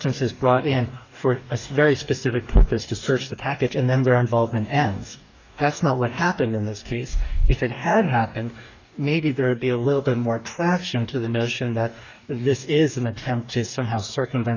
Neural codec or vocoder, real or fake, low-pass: codec, 44.1 kHz, 2.6 kbps, DAC; fake; 7.2 kHz